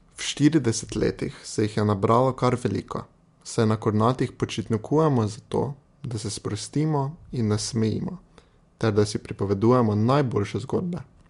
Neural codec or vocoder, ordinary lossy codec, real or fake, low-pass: none; MP3, 64 kbps; real; 10.8 kHz